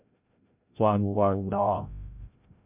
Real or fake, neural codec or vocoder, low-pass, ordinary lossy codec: fake; codec, 16 kHz, 0.5 kbps, FreqCodec, larger model; 3.6 kHz; MP3, 32 kbps